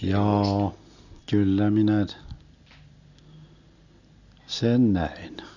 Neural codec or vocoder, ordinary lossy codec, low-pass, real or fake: none; none; 7.2 kHz; real